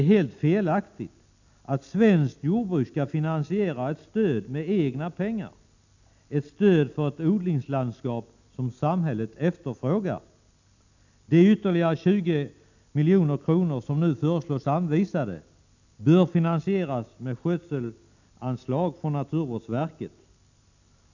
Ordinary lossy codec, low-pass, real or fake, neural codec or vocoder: none; 7.2 kHz; real; none